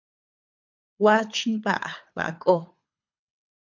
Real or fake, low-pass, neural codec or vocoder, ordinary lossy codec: fake; 7.2 kHz; codec, 24 kHz, 3 kbps, HILCodec; MP3, 64 kbps